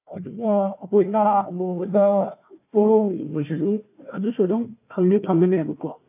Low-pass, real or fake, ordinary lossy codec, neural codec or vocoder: 3.6 kHz; fake; AAC, 24 kbps; codec, 16 kHz, 1 kbps, FunCodec, trained on Chinese and English, 50 frames a second